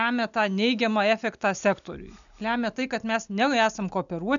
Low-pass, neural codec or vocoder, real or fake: 7.2 kHz; none; real